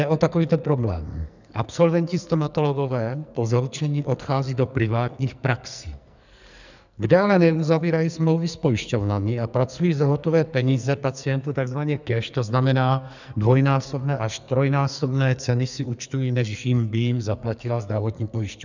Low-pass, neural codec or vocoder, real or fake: 7.2 kHz; codec, 44.1 kHz, 2.6 kbps, SNAC; fake